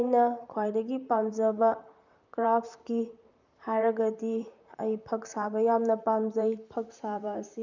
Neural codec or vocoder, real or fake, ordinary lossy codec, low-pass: vocoder, 44.1 kHz, 128 mel bands, Pupu-Vocoder; fake; none; 7.2 kHz